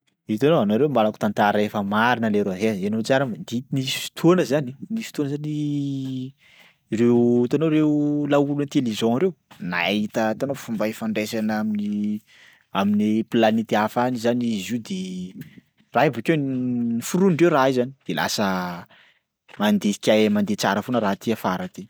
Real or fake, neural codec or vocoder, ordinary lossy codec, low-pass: real; none; none; none